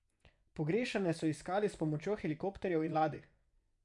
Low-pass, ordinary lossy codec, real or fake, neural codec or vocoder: 10.8 kHz; none; fake; vocoder, 24 kHz, 100 mel bands, Vocos